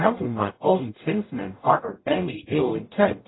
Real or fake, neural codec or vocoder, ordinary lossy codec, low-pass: fake; codec, 44.1 kHz, 0.9 kbps, DAC; AAC, 16 kbps; 7.2 kHz